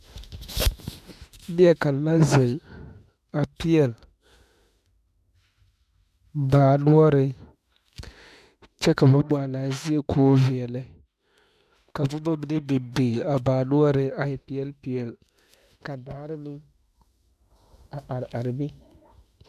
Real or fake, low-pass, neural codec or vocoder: fake; 14.4 kHz; autoencoder, 48 kHz, 32 numbers a frame, DAC-VAE, trained on Japanese speech